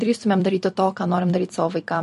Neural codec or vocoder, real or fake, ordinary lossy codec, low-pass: vocoder, 44.1 kHz, 128 mel bands every 256 samples, BigVGAN v2; fake; MP3, 48 kbps; 14.4 kHz